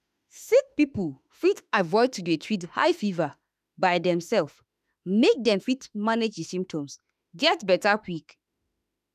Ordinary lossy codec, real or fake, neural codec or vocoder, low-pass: none; fake; autoencoder, 48 kHz, 32 numbers a frame, DAC-VAE, trained on Japanese speech; 14.4 kHz